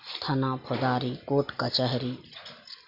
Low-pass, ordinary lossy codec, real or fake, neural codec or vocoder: 5.4 kHz; none; real; none